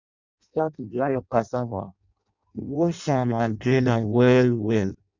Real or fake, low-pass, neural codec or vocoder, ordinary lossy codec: fake; 7.2 kHz; codec, 16 kHz in and 24 kHz out, 0.6 kbps, FireRedTTS-2 codec; none